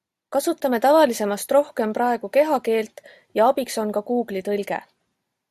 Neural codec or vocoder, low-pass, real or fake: none; 14.4 kHz; real